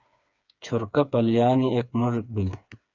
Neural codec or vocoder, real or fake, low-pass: codec, 16 kHz, 4 kbps, FreqCodec, smaller model; fake; 7.2 kHz